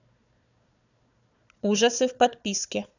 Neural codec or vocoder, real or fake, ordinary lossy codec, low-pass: codec, 16 kHz, 4 kbps, FunCodec, trained on Chinese and English, 50 frames a second; fake; none; 7.2 kHz